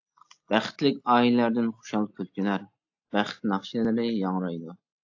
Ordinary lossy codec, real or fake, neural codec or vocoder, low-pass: AAC, 48 kbps; real; none; 7.2 kHz